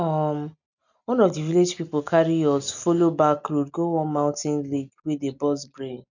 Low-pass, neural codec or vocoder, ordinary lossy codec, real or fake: 7.2 kHz; none; none; real